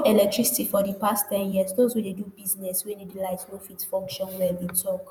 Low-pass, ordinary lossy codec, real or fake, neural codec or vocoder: none; none; fake; vocoder, 48 kHz, 128 mel bands, Vocos